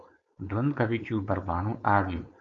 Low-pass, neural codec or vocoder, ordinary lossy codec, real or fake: 7.2 kHz; codec, 16 kHz, 4.8 kbps, FACodec; Opus, 64 kbps; fake